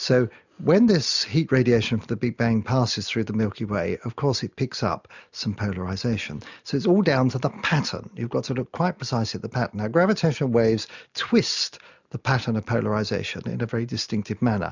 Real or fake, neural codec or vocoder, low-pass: real; none; 7.2 kHz